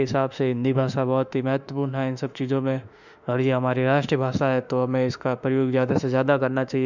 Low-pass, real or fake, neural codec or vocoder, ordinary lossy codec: 7.2 kHz; fake; autoencoder, 48 kHz, 32 numbers a frame, DAC-VAE, trained on Japanese speech; none